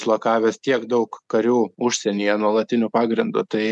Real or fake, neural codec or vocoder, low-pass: real; none; 10.8 kHz